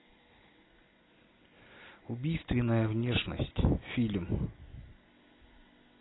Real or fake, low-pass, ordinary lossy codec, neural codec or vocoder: real; 7.2 kHz; AAC, 16 kbps; none